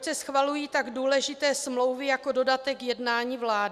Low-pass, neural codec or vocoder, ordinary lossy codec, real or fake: 14.4 kHz; none; Opus, 64 kbps; real